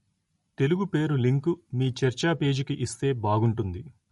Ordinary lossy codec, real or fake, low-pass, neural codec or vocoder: MP3, 48 kbps; real; 19.8 kHz; none